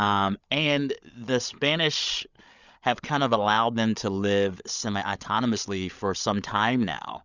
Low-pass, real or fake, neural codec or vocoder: 7.2 kHz; fake; codec, 16 kHz, 8 kbps, FreqCodec, larger model